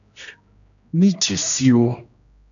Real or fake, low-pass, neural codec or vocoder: fake; 7.2 kHz; codec, 16 kHz, 1 kbps, X-Codec, HuBERT features, trained on general audio